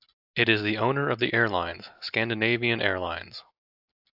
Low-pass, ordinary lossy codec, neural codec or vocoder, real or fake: 5.4 kHz; AAC, 48 kbps; none; real